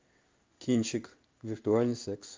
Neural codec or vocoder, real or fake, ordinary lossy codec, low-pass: codec, 16 kHz in and 24 kHz out, 1 kbps, XY-Tokenizer; fake; Opus, 32 kbps; 7.2 kHz